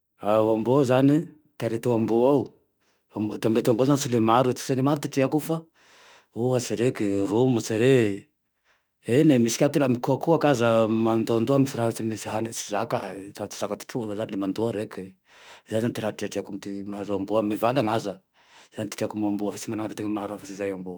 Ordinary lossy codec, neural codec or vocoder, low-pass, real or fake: none; autoencoder, 48 kHz, 32 numbers a frame, DAC-VAE, trained on Japanese speech; none; fake